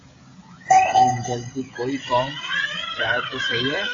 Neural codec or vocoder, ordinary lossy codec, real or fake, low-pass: codec, 16 kHz, 16 kbps, FreqCodec, smaller model; AAC, 32 kbps; fake; 7.2 kHz